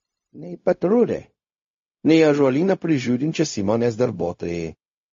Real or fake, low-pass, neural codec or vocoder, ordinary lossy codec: fake; 7.2 kHz; codec, 16 kHz, 0.4 kbps, LongCat-Audio-Codec; MP3, 32 kbps